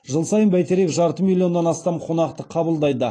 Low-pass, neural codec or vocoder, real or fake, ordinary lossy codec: 9.9 kHz; none; real; AAC, 32 kbps